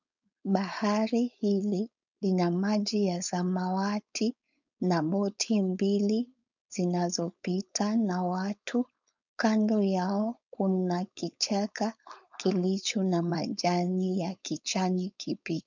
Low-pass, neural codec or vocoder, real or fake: 7.2 kHz; codec, 16 kHz, 4.8 kbps, FACodec; fake